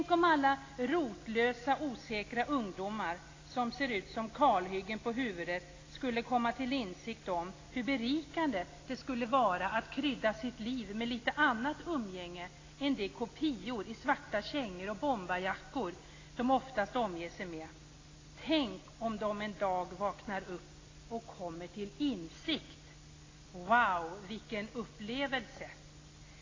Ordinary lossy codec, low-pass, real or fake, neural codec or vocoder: AAC, 32 kbps; 7.2 kHz; real; none